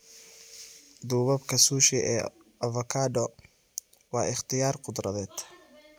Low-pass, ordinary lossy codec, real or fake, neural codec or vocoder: none; none; real; none